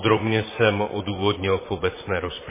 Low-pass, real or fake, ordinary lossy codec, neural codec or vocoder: 3.6 kHz; fake; MP3, 16 kbps; vocoder, 44.1 kHz, 128 mel bands, Pupu-Vocoder